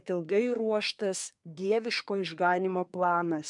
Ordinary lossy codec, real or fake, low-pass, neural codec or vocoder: MP3, 96 kbps; fake; 10.8 kHz; codec, 24 kHz, 1 kbps, SNAC